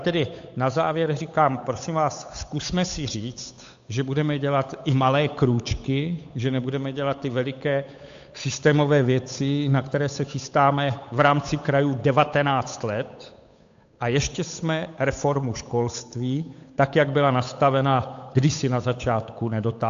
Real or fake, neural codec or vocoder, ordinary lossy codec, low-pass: fake; codec, 16 kHz, 8 kbps, FunCodec, trained on Chinese and English, 25 frames a second; AAC, 64 kbps; 7.2 kHz